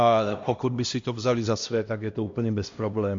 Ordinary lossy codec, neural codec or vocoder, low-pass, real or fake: MP3, 48 kbps; codec, 16 kHz, 1 kbps, X-Codec, HuBERT features, trained on LibriSpeech; 7.2 kHz; fake